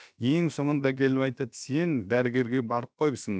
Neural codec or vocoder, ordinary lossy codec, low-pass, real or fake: codec, 16 kHz, about 1 kbps, DyCAST, with the encoder's durations; none; none; fake